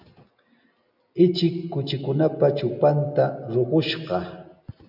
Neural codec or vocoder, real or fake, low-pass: none; real; 5.4 kHz